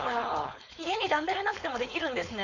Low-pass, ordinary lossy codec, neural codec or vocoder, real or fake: 7.2 kHz; none; codec, 16 kHz, 4.8 kbps, FACodec; fake